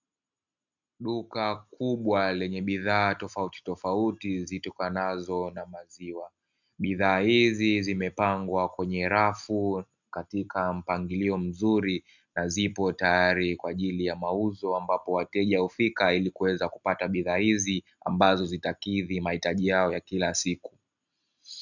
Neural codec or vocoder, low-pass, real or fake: none; 7.2 kHz; real